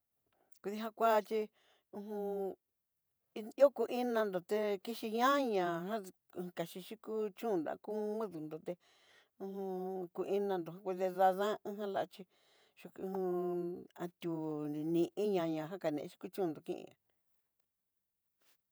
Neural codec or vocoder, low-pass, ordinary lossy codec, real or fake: vocoder, 48 kHz, 128 mel bands, Vocos; none; none; fake